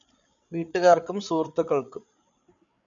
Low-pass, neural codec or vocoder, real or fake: 7.2 kHz; codec, 16 kHz, 8 kbps, FreqCodec, larger model; fake